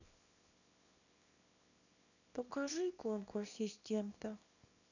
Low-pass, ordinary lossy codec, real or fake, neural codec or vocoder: 7.2 kHz; none; fake; codec, 24 kHz, 0.9 kbps, WavTokenizer, small release